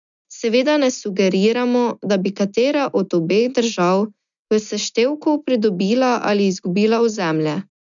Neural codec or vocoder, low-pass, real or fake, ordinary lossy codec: none; 7.2 kHz; real; none